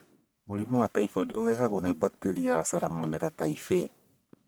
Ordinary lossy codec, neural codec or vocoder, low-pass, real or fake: none; codec, 44.1 kHz, 1.7 kbps, Pupu-Codec; none; fake